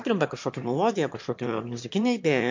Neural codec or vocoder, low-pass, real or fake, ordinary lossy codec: autoencoder, 22.05 kHz, a latent of 192 numbers a frame, VITS, trained on one speaker; 7.2 kHz; fake; MP3, 48 kbps